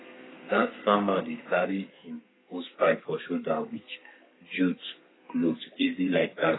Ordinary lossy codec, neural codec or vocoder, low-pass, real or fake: AAC, 16 kbps; codec, 32 kHz, 1.9 kbps, SNAC; 7.2 kHz; fake